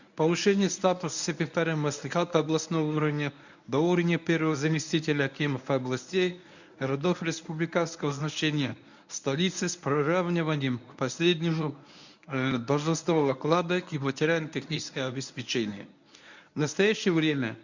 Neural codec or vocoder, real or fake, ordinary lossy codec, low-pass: codec, 24 kHz, 0.9 kbps, WavTokenizer, medium speech release version 1; fake; none; 7.2 kHz